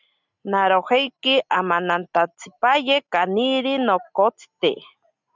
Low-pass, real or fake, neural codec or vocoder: 7.2 kHz; real; none